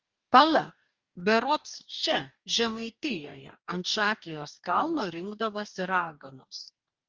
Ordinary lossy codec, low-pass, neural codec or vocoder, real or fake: Opus, 32 kbps; 7.2 kHz; codec, 44.1 kHz, 2.6 kbps, DAC; fake